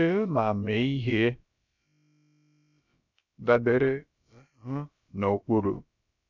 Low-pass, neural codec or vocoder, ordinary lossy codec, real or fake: 7.2 kHz; codec, 16 kHz, about 1 kbps, DyCAST, with the encoder's durations; none; fake